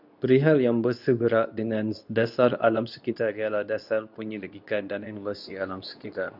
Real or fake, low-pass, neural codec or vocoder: fake; 5.4 kHz; codec, 24 kHz, 0.9 kbps, WavTokenizer, medium speech release version 2